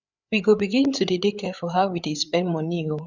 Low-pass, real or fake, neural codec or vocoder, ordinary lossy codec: 7.2 kHz; fake; codec, 16 kHz, 8 kbps, FreqCodec, larger model; none